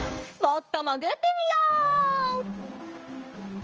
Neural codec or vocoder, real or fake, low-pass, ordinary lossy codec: codec, 16 kHz in and 24 kHz out, 1 kbps, XY-Tokenizer; fake; 7.2 kHz; Opus, 24 kbps